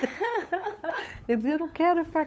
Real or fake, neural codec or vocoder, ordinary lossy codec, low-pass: fake; codec, 16 kHz, 8 kbps, FunCodec, trained on LibriTTS, 25 frames a second; none; none